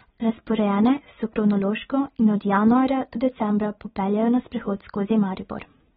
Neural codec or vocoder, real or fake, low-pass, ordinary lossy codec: none; real; 14.4 kHz; AAC, 16 kbps